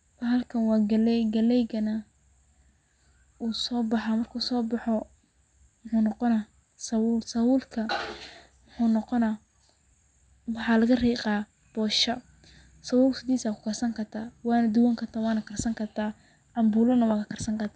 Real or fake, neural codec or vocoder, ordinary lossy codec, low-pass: real; none; none; none